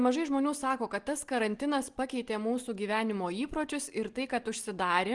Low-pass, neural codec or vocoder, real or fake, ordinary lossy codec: 10.8 kHz; none; real; Opus, 24 kbps